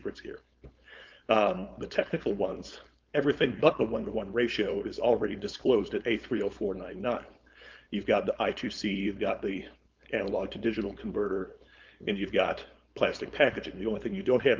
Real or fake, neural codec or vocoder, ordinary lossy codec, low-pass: fake; codec, 16 kHz, 4.8 kbps, FACodec; Opus, 24 kbps; 7.2 kHz